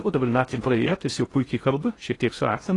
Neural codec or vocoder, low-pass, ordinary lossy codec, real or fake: codec, 16 kHz in and 24 kHz out, 0.6 kbps, FocalCodec, streaming, 4096 codes; 10.8 kHz; AAC, 32 kbps; fake